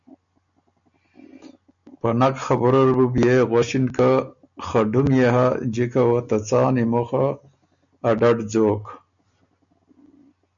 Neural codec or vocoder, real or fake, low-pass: none; real; 7.2 kHz